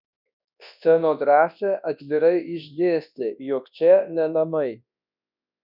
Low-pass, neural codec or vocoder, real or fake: 5.4 kHz; codec, 24 kHz, 0.9 kbps, WavTokenizer, large speech release; fake